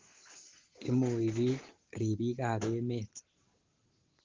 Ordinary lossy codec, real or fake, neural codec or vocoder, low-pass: Opus, 16 kbps; real; none; 7.2 kHz